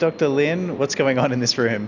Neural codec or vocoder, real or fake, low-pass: none; real; 7.2 kHz